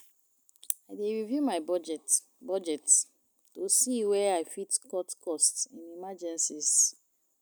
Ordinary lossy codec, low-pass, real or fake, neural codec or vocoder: none; none; real; none